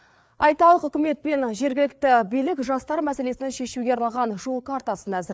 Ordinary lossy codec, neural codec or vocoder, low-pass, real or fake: none; codec, 16 kHz, 4 kbps, FreqCodec, larger model; none; fake